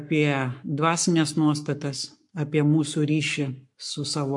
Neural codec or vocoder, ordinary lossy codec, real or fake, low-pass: codec, 44.1 kHz, 7.8 kbps, Pupu-Codec; MP3, 64 kbps; fake; 10.8 kHz